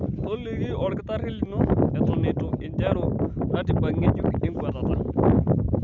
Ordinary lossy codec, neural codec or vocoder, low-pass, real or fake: none; none; 7.2 kHz; real